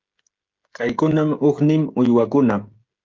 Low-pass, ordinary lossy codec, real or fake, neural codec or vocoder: 7.2 kHz; Opus, 32 kbps; fake; codec, 16 kHz, 16 kbps, FreqCodec, smaller model